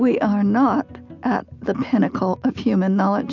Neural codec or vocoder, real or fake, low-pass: none; real; 7.2 kHz